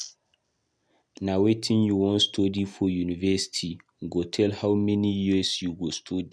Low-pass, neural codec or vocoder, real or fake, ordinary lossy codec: none; none; real; none